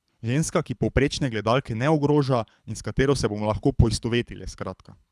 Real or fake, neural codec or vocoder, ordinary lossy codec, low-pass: fake; codec, 24 kHz, 6 kbps, HILCodec; none; none